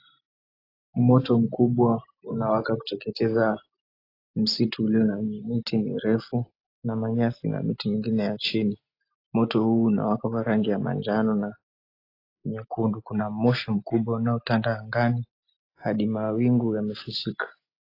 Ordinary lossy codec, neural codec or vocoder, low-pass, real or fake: AAC, 32 kbps; none; 5.4 kHz; real